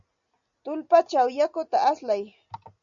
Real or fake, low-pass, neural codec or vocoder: real; 7.2 kHz; none